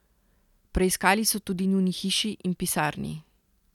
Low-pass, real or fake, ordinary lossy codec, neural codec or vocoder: 19.8 kHz; real; none; none